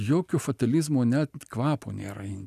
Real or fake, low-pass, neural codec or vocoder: real; 14.4 kHz; none